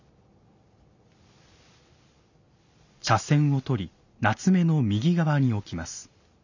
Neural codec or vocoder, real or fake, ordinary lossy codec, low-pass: none; real; none; 7.2 kHz